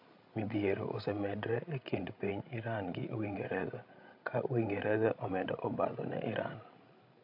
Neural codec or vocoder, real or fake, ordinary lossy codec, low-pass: codec, 16 kHz, 16 kbps, FreqCodec, larger model; fake; AAC, 48 kbps; 5.4 kHz